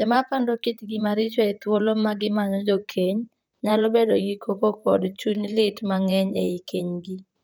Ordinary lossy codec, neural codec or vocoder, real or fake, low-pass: none; vocoder, 44.1 kHz, 128 mel bands, Pupu-Vocoder; fake; none